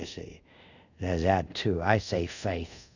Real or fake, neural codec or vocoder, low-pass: fake; codec, 24 kHz, 0.5 kbps, DualCodec; 7.2 kHz